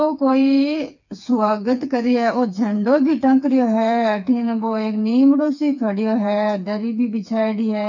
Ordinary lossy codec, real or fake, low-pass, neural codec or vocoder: none; fake; 7.2 kHz; codec, 16 kHz, 4 kbps, FreqCodec, smaller model